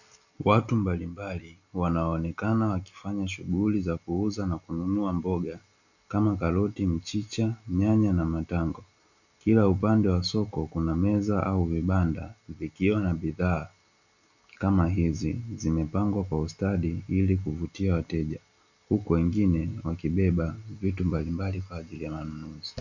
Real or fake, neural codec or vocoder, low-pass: real; none; 7.2 kHz